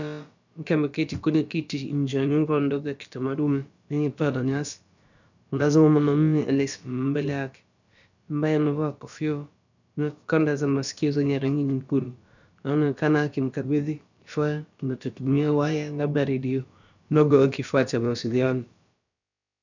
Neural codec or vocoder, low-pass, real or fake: codec, 16 kHz, about 1 kbps, DyCAST, with the encoder's durations; 7.2 kHz; fake